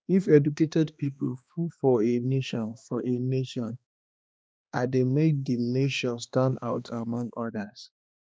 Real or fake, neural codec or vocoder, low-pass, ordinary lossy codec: fake; codec, 16 kHz, 2 kbps, X-Codec, HuBERT features, trained on balanced general audio; none; none